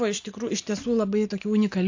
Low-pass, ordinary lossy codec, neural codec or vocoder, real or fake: 7.2 kHz; AAC, 48 kbps; none; real